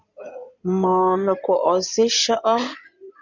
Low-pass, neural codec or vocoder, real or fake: 7.2 kHz; codec, 16 kHz in and 24 kHz out, 2.2 kbps, FireRedTTS-2 codec; fake